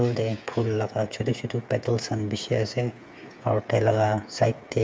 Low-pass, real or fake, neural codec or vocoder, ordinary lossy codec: none; fake; codec, 16 kHz, 8 kbps, FreqCodec, smaller model; none